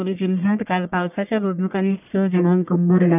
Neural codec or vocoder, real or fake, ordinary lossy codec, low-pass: codec, 44.1 kHz, 1.7 kbps, Pupu-Codec; fake; none; 3.6 kHz